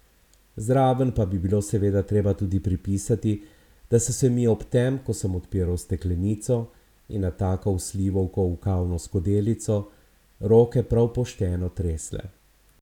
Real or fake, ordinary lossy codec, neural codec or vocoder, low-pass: real; none; none; 19.8 kHz